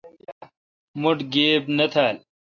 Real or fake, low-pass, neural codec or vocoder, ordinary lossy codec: real; 7.2 kHz; none; AAC, 48 kbps